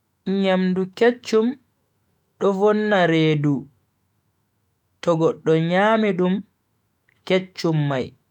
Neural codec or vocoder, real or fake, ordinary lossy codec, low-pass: autoencoder, 48 kHz, 128 numbers a frame, DAC-VAE, trained on Japanese speech; fake; MP3, 96 kbps; 19.8 kHz